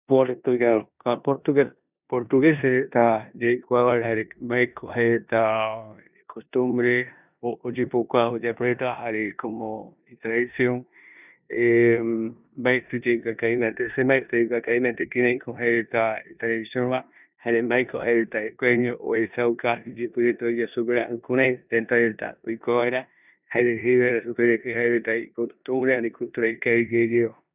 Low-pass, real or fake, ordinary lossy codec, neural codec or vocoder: 3.6 kHz; fake; none; codec, 16 kHz in and 24 kHz out, 0.9 kbps, LongCat-Audio-Codec, four codebook decoder